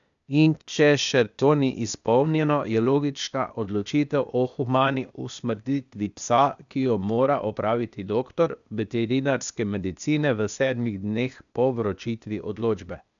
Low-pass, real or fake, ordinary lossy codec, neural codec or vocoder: 7.2 kHz; fake; none; codec, 16 kHz, 0.8 kbps, ZipCodec